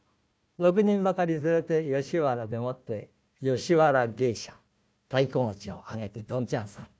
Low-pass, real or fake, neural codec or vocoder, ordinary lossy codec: none; fake; codec, 16 kHz, 1 kbps, FunCodec, trained on Chinese and English, 50 frames a second; none